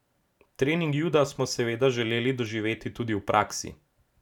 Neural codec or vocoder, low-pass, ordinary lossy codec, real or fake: vocoder, 44.1 kHz, 128 mel bands every 512 samples, BigVGAN v2; 19.8 kHz; none; fake